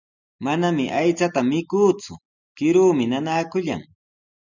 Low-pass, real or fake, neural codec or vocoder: 7.2 kHz; real; none